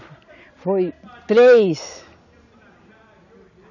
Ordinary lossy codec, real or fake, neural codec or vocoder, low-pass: none; real; none; 7.2 kHz